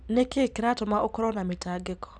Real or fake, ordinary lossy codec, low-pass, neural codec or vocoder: real; none; none; none